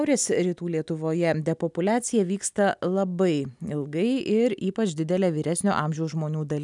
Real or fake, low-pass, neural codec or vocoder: real; 10.8 kHz; none